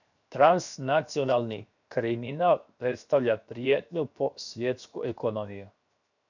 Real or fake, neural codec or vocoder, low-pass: fake; codec, 16 kHz, 0.7 kbps, FocalCodec; 7.2 kHz